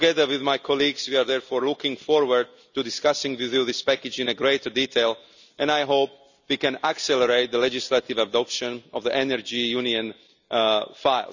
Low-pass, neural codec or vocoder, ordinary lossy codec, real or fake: 7.2 kHz; none; none; real